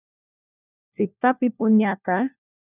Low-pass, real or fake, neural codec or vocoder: 3.6 kHz; fake; codec, 16 kHz, 1 kbps, FunCodec, trained on LibriTTS, 50 frames a second